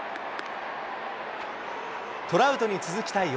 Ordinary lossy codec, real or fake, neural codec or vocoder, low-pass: none; real; none; none